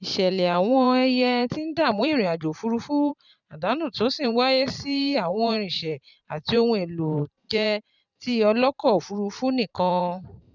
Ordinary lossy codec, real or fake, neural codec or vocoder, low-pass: none; fake; vocoder, 44.1 kHz, 128 mel bands every 512 samples, BigVGAN v2; 7.2 kHz